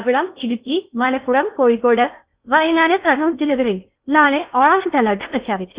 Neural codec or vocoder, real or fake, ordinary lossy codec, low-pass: codec, 16 kHz in and 24 kHz out, 0.6 kbps, FocalCodec, streaming, 4096 codes; fake; Opus, 64 kbps; 3.6 kHz